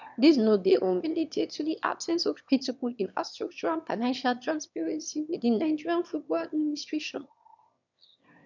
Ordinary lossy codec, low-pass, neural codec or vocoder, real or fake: none; 7.2 kHz; autoencoder, 22.05 kHz, a latent of 192 numbers a frame, VITS, trained on one speaker; fake